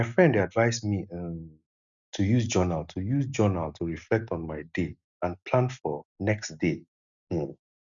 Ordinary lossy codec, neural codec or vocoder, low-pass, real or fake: none; none; 7.2 kHz; real